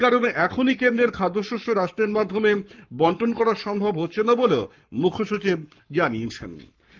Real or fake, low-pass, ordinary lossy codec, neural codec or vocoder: fake; 7.2 kHz; Opus, 32 kbps; codec, 44.1 kHz, 7.8 kbps, Pupu-Codec